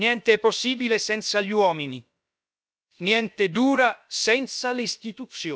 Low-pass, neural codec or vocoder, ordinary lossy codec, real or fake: none; codec, 16 kHz, about 1 kbps, DyCAST, with the encoder's durations; none; fake